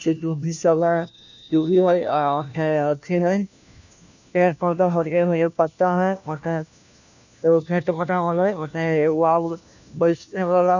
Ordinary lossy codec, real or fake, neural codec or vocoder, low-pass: none; fake; codec, 16 kHz, 1 kbps, FunCodec, trained on LibriTTS, 50 frames a second; 7.2 kHz